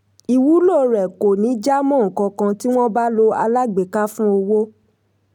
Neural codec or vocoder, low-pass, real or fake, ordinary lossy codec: none; 19.8 kHz; real; none